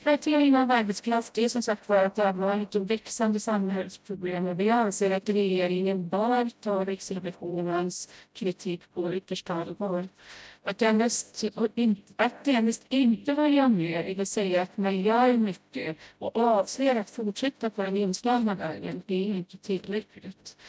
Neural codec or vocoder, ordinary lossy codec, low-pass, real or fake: codec, 16 kHz, 0.5 kbps, FreqCodec, smaller model; none; none; fake